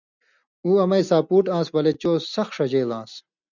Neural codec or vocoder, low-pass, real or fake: none; 7.2 kHz; real